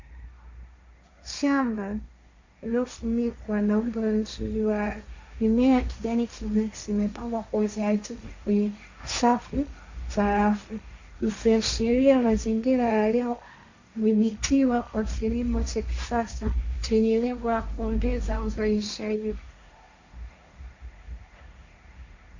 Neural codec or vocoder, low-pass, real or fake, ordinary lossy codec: codec, 16 kHz, 1.1 kbps, Voila-Tokenizer; 7.2 kHz; fake; Opus, 64 kbps